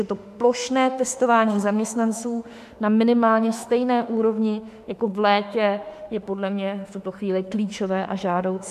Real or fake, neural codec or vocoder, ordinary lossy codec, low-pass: fake; autoencoder, 48 kHz, 32 numbers a frame, DAC-VAE, trained on Japanese speech; AAC, 96 kbps; 14.4 kHz